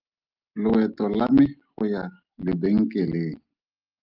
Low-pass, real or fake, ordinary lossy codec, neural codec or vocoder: 5.4 kHz; real; Opus, 32 kbps; none